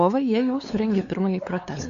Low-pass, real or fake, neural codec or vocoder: 7.2 kHz; fake; codec, 16 kHz, 4 kbps, X-Codec, WavLM features, trained on Multilingual LibriSpeech